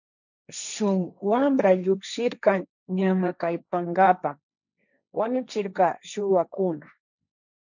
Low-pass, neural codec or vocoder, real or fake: 7.2 kHz; codec, 16 kHz, 1.1 kbps, Voila-Tokenizer; fake